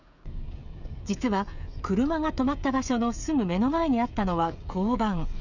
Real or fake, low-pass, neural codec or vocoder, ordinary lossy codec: fake; 7.2 kHz; codec, 16 kHz, 16 kbps, FreqCodec, smaller model; none